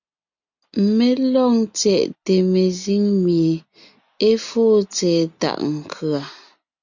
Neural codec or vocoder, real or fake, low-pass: none; real; 7.2 kHz